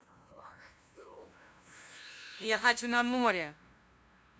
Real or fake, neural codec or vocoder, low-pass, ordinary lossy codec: fake; codec, 16 kHz, 0.5 kbps, FunCodec, trained on LibriTTS, 25 frames a second; none; none